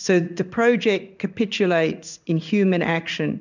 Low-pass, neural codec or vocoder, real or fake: 7.2 kHz; codec, 16 kHz in and 24 kHz out, 1 kbps, XY-Tokenizer; fake